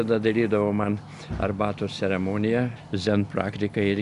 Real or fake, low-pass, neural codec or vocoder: real; 10.8 kHz; none